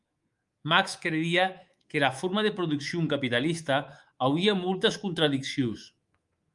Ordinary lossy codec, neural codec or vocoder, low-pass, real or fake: Opus, 32 kbps; codec, 24 kHz, 3.1 kbps, DualCodec; 10.8 kHz; fake